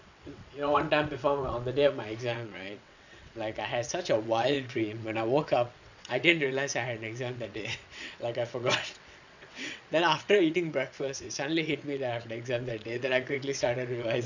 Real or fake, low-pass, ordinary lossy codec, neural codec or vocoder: fake; 7.2 kHz; none; vocoder, 22.05 kHz, 80 mel bands, WaveNeXt